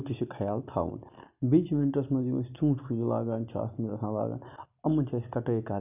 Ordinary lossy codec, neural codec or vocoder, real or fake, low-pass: none; none; real; 3.6 kHz